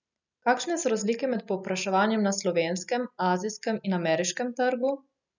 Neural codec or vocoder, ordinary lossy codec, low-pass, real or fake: none; none; 7.2 kHz; real